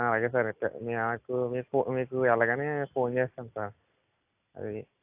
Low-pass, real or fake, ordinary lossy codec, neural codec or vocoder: 3.6 kHz; real; AAC, 32 kbps; none